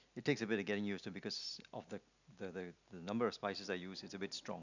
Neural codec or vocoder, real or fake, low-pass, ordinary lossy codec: none; real; 7.2 kHz; none